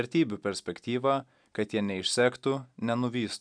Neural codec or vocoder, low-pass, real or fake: none; 9.9 kHz; real